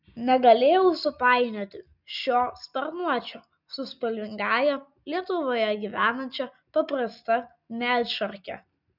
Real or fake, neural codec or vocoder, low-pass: real; none; 5.4 kHz